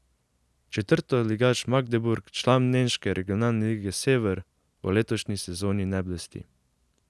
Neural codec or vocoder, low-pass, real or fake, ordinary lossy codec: none; none; real; none